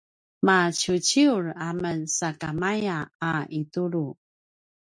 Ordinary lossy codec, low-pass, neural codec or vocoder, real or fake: MP3, 64 kbps; 9.9 kHz; none; real